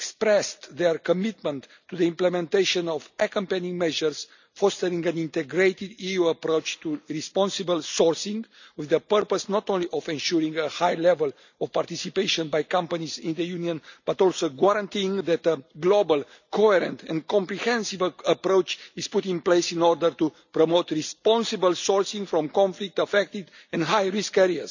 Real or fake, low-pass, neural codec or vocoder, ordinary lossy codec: real; 7.2 kHz; none; none